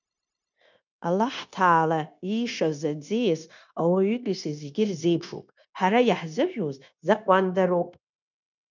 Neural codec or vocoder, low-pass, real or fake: codec, 16 kHz, 0.9 kbps, LongCat-Audio-Codec; 7.2 kHz; fake